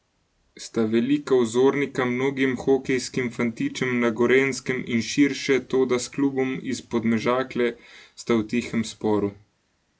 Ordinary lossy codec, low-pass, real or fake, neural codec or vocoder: none; none; real; none